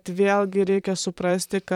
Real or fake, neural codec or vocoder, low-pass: fake; vocoder, 44.1 kHz, 128 mel bands, Pupu-Vocoder; 19.8 kHz